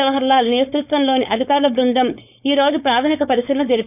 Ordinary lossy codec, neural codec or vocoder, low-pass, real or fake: none; codec, 16 kHz, 4.8 kbps, FACodec; 3.6 kHz; fake